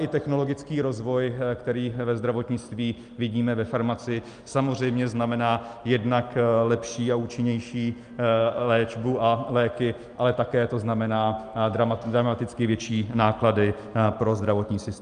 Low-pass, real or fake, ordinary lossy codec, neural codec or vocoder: 9.9 kHz; real; Opus, 32 kbps; none